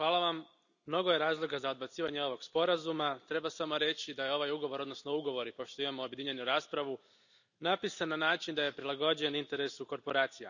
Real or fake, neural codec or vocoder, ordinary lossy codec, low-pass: real; none; none; 7.2 kHz